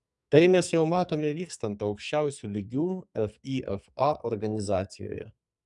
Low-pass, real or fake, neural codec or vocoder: 10.8 kHz; fake; codec, 44.1 kHz, 2.6 kbps, SNAC